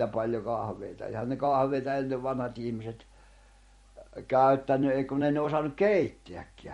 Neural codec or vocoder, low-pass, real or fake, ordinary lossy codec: vocoder, 44.1 kHz, 128 mel bands every 256 samples, BigVGAN v2; 19.8 kHz; fake; MP3, 48 kbps